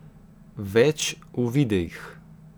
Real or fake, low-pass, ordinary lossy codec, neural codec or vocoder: real; none; none; none